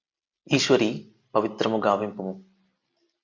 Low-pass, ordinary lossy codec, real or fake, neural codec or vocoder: 7.2 kHz; Opus, 64 kbps; real; none